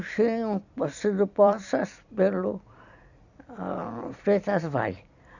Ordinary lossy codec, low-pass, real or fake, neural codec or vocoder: none; 7.2 kHz; fake; vocoder, 44.1 kHz, 128 mel bands every 256 samples, BigVGAN v2